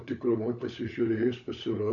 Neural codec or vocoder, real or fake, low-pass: codec, 16 kHz, 16 kbps, FunCodec, trained on LibriTTS, 50 frames a second; fake; 7.2 kHz